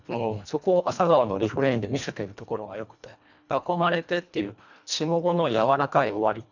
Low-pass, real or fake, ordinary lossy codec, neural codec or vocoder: 7.2 kHz; fake; none; codec, 24 kHz, 1.5 kbps, HILCodec